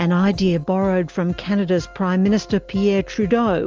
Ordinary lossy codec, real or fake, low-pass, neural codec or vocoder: Opus, 24 kbps; real; 7.2 kHz; none